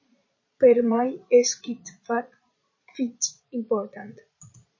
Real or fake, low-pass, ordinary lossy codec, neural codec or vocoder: fake; 7.2 kHz; MP3, 32 kbps; vocoder, 22.05 kHz, 80 mel bands, Vocos